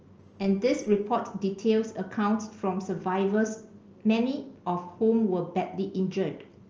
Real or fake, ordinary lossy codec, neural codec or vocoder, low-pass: real; Opus, 24 kbps; none; 7.2 kHz